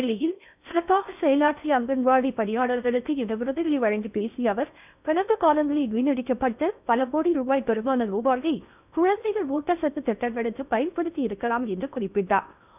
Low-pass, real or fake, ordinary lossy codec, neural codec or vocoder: 3.6 kHz; fake; none; codec, 16 kHz in and 24 kHz out, 0.6 kbps, FocalCodec, streaming, 4096 codes